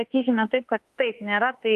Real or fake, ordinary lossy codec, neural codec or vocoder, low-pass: fake; Opus, 32 kbps; autoencoder, 48 kHz, 32 numbers a frame, DAC-VAE, trained on Japanese speech; 14.4 kHz